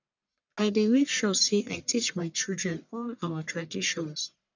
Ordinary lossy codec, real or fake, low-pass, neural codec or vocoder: none; fake; 7.2 kHz; codec, 44.1 kHz, 1.7 kbps, Pupu-Codec